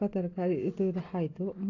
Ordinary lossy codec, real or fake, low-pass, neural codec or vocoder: AAC, 32 kbps; real; 7.2 kHz; none